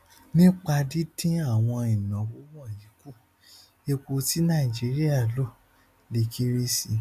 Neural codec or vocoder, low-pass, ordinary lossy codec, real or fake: none; 14.4 kHz; none; real